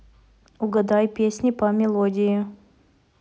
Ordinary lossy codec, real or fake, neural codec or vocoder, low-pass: none; real; none; none